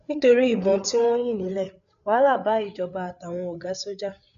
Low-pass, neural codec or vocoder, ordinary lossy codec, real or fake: 7.2 kHz; codec, 16 kHz, 8 kbps, FreqCodec, larger model; none; fake